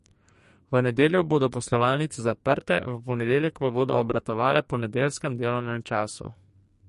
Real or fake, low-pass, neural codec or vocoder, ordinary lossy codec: fake; 14.4 kHz; codec, 44.1 kHz, 2.6 kbps, SNAC; MP3, 48 kbps